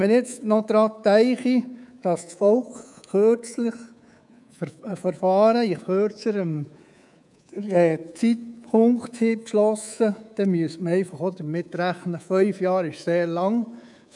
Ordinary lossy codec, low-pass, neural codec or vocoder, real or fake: none; 10.8 kHz; codec, 24 kHz, 3.1 kbps, DualCodec; fake